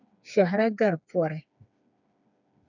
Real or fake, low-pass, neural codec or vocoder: fake; 7.2 kHz; codec, 16 kHz, 4 kbps, FreqCodec, smaller model